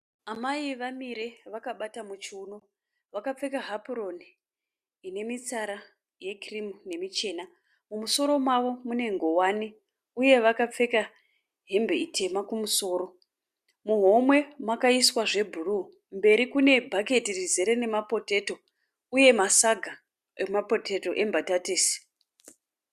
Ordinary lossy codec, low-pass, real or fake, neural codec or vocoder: Opus, 64 kbps; 14.4 kHz; real; none